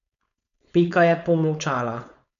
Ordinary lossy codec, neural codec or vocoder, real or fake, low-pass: none; codec, 16 kHz, 4.8 kbps, FACodec; fake; 7.2 kHz